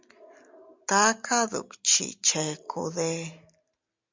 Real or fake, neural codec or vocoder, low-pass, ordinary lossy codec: real; none; 7.2 kHz; MP3, 64 kbps